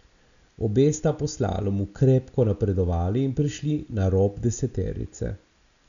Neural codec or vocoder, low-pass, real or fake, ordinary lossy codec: none; 7.2 kHz; real; none